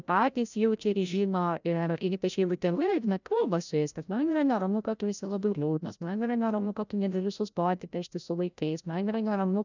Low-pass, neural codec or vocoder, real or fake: 7.2 kHz; codec, 16 kHz, 0.5 kbps, FreqCodec, larger model; fake